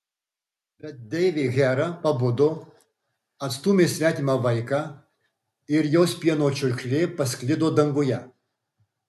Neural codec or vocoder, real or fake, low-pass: none; real; 14.4 kHz